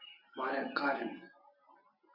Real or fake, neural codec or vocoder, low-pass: real; none; 5.4 kHz